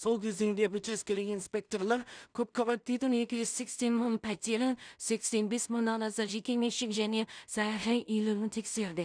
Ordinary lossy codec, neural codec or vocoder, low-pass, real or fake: none; codec, 16 kHz in and 24 kHz out, 0.4 kbps, LongCat-Audio-Codec, two codebook decoder; 9.9 kHz; fake